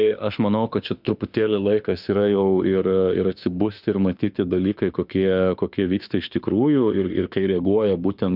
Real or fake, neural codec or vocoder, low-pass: fake; autoencoder, 48 kHz, 32 numbers a frame, DAC-VAE, trained on Japanese speech; 5.4 kHz